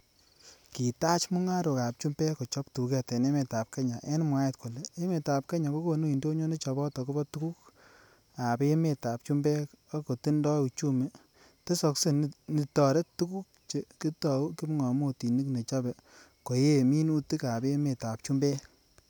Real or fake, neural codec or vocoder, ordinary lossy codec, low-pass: real; none; none; none